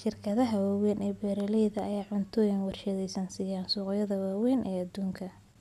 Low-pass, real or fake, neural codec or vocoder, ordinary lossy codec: 14.4 kHz; real; none; none